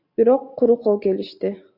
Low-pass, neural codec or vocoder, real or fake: 5.4 kHz; none; real